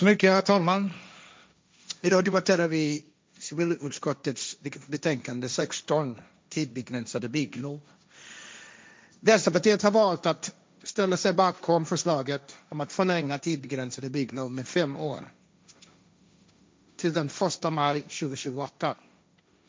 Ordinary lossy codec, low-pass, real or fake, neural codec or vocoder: none; none; fake; codec, 16 kHz, 1.1 kbps, Voila-Tokenizer